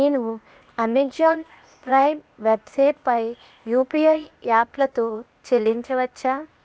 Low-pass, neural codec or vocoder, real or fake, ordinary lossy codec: none; codec, 16 kHz, 0.8 kbps, ZipCodec; fake; none